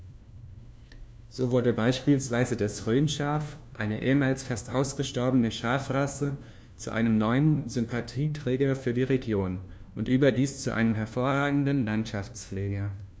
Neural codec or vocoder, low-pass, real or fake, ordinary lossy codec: codec, 16 kHz, 1 kbps, FunCodec, trained on LibriTTS, 50 frames a second; none; fake; none